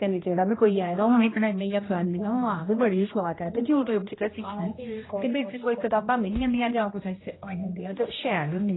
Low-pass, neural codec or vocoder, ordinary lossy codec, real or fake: 7.2 kHz; codec, 16 kHz, 1 kbps, X-Codec, HuBERT features, trained on general audio; AAC, 16 kbps; fake